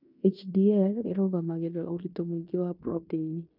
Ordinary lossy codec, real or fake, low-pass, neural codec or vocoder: none; fake; 5.4 kHz; codec, 16 kHz in and 24 kHz out, 0.9 kbps, LongCat-Audio-Codec, fine tuned four codebook decoder